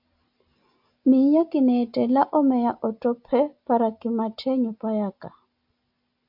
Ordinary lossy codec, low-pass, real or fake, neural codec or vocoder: AAC, 48 kbps; 5.4 kHz; real; none